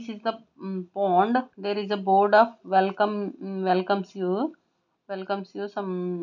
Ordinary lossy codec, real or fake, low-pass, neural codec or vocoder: none; real; 7.2 kHz; none